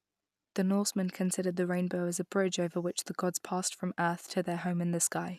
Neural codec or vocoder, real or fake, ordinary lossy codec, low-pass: none; real; none; 14.4 kHz